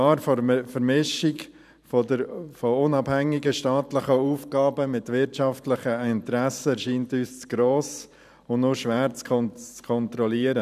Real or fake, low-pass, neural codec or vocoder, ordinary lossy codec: fake; 14.4 kHz; vocoder, 44.1 kHz, 128 mel bands every 512 samples, BigVGAN v2; none